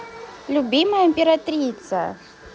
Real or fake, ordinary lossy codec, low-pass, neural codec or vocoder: real; none; none; none